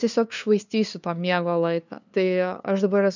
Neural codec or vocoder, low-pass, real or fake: codec, 16 kHz, 2 kbps, FunCodec, trained on LibriTTS, 25 frames a second; 7.2 kHz; fake